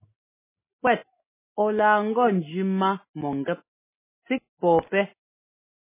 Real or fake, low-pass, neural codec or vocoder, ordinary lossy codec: real; 3.6 kHz; none; MP3, 16 kbps